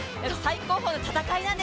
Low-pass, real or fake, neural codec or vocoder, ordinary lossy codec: none; real; none; none